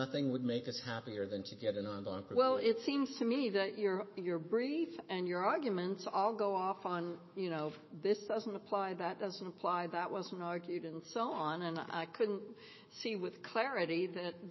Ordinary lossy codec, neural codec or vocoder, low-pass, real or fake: MP3, 24 kbps; autoencoder, 48 kHz, 128 numbers a frame, DAC-VAE, trained on Japanese speech; 7.2 kHz; fake